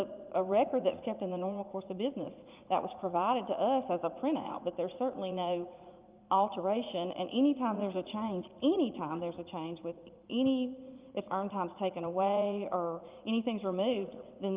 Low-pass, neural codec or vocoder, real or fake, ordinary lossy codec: 3.6 kHz; vocoder, 44.1 kHz, 80 mel bands, Vocos; fake; Opus, 24 kbps